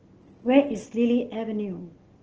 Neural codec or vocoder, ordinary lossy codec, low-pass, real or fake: none; Opus, 16 kbps; 7.2 kHz; real